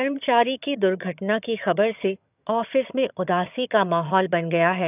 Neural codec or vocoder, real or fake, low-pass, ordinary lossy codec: vocoder, 22.05 kHz, 80 mel bands, HiFi-GAN; fake; 3.6 kHz; none